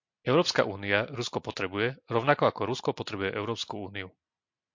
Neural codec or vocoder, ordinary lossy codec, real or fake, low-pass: none; MP3, 48 kbps; real; 7.2 kHz